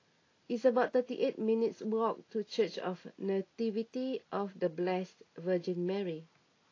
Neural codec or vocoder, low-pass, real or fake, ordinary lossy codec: none; 7.2 kHz; real; AAC, 32 kbps